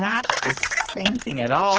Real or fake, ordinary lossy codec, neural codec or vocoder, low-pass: fake; Opus, 16 kbps; codec, 16 kHz, 2 kbps, X-Codec, HuBERT features, trained on general audio; 7.2 kHz